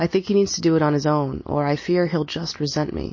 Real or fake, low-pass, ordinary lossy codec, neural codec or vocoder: real; 7.2 kHz; MP3, 32 kbps; none